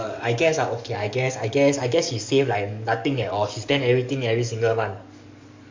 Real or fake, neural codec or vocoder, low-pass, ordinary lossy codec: fake; codec, 44.1 kHz, 7.8 kbps, DAC; 7.2 kHz; MP3, 64 kbps